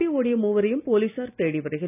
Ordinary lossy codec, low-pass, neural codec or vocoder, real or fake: none; 3.6 kHz; none; real